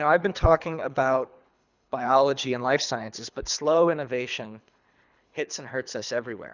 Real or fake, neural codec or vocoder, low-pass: fake; codec, 24 kHz, 3 kbps, HILCodec; 7.2 kHz